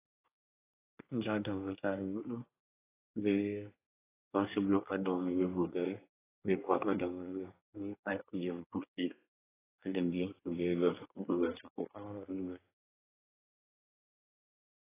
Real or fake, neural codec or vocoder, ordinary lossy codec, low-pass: fake; codec, 24 kHz, 1 kbps, SNAC; AAC, 16 kbps; 3.6 kHz